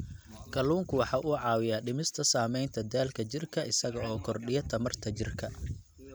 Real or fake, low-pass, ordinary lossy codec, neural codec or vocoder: real; none; none; none